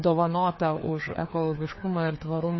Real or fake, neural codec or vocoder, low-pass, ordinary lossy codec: fake; codec, 16 kHz, 2 kbps, FreqCodec, larger model; 7.2 kHz; MP3, 24 kbps